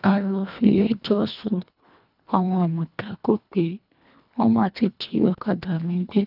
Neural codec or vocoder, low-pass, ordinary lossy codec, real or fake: codec, 24 kHz, 1.5 kbps, HILCodec; 5.4 kHz; none; fake